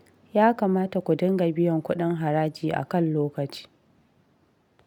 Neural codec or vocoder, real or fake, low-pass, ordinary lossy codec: none; real; 19.8 kHz; none